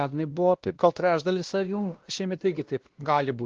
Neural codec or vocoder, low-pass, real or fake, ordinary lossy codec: codec, 16 kHz, 1 kbps, X-Codec, WavLM features, trained on Multilingual LibriSpeech; 7.2 kHz; fake; Opus, 16 kbps